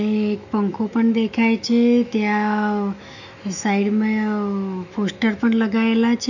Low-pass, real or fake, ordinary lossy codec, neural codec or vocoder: 7.2 kHz; fake; none; autoencoder, 48 kHz, 128 numbers a frame, DAC-VAE, trained on Japanese speech